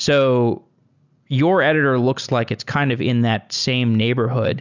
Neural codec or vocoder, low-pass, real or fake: none; 7.2 kHz; real